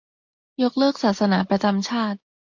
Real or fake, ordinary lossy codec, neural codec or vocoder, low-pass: real; MP3, 48 kbps; none; 7.2 kHz